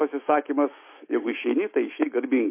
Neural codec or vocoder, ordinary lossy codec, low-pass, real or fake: autoencoder, 48 kHz, 128 numbers a frame, DAC-VAE, trained on Japanese speech; MP3, 24 kbps; 3.6 kHz; fake